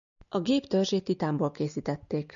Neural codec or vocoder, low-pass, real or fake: none; 7.2 kHz; real